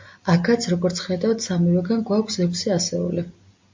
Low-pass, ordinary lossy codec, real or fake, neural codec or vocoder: 7.2 kHz; MP3, 48 kbps; real; none